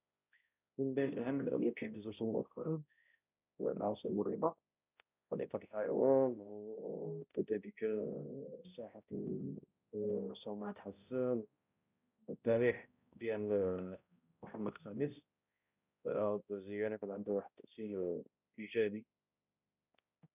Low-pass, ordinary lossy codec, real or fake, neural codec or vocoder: 3.6 kHz; none; fake; codec, 16 kHz, 0.5 kbps, X-Codec, HuBERT features, trained on balanced general audio